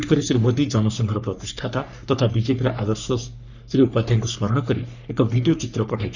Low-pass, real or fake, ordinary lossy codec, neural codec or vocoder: 7.2 kHz; fake; none; codec, 44.1 kHz, 3.4 kbps, Pupu-Codec